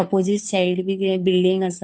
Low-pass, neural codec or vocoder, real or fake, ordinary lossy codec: none; codec, 16 kHz, 2 kbps, FunCodec, trained on Chinese and English, 25 frames a second; fake; none